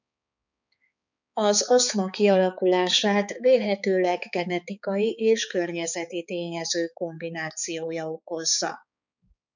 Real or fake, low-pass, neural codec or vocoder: fake; 7.2 kHz; codec, 16 kHz, 4 kbps, X-Codec, HuBERT features, trained on balanced general audio